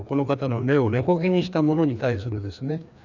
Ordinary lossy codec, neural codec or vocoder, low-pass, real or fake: none; codec, 16 kHz, 2 kbps, FreqCodec, larger model; 7.2 kHz; fake